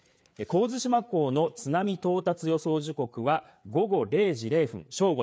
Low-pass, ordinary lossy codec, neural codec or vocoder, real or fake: none; none; codec, 16 kHz, 4 kbps, FreqCodec, larger model; fake